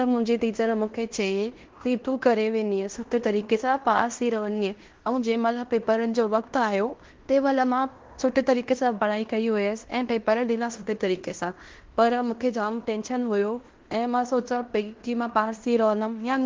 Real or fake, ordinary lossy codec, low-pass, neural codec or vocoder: fake; Opus, 16 kbps; 7.2 kHz; codec, 16 kHz in and 24 kHz out, 0.9 kbps, LongCat-Audio-Codec, fine tuned four codebook decoder